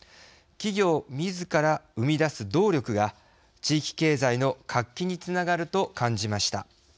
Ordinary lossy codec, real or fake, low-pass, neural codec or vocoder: none; real; none; none